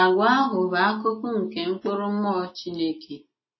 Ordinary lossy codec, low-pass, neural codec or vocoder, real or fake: MP3, 24 kbps; 7.2 kHz; none; real